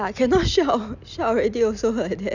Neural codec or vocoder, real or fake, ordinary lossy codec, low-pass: none; real; none; 7.2 kHz